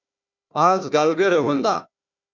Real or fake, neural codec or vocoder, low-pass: fake; codec, 16 kHz, 1 kbps, FunCodec, trained on Chinese and English, 50 frames a second; 7.2 kHz